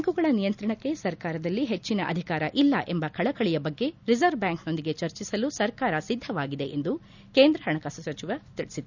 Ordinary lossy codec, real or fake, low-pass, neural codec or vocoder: none; real; 7.2 kHz; none